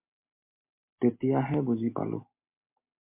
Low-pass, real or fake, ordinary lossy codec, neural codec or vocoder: 3.6 kHz; real; MP3, 24 kbps; none